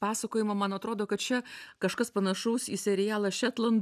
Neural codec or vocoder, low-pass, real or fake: none; 14.4 kHz; real